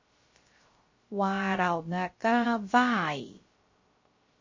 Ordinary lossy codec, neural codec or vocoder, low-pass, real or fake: MP3, 32 kbps; codec, 16 kHz, 0.3 kbps, FocalCodec; 7.2 kHz; fake